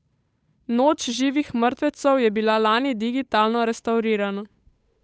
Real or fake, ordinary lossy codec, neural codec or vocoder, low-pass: fake; none; codec, 16 kHz, 8 kbps, FunCodec, trained on Chinese and English, 25 frames a second; none